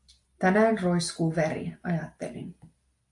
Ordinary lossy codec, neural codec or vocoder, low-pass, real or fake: AAC, 64 kbps; none; 10.8 kHz; real